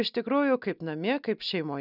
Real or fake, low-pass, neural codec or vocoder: real; 5.4 kHz; none